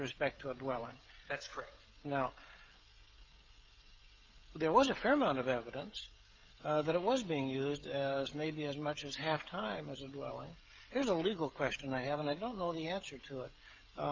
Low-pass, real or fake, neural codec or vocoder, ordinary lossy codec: 7.2 kHz; fake; codec, 16 kHz, 16 kbps, FreqCodec, smaller model; Opus, 32 kbps